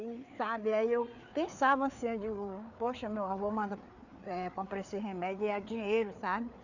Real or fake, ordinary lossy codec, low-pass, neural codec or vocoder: fake; none; 7.2 kHz; codec, 16 kHz, 4 kbps, FreqCodec, larger model